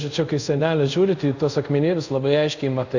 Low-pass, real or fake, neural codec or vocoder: 7.2 kHz; fake; codec, 24 kHz, 0.5 kbps, DualCodec